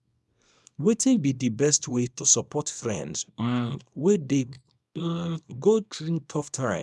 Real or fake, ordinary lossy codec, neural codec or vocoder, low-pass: fake; none; codec, 24 kHz, 0.9 kbps, WavTokenizer, small release; none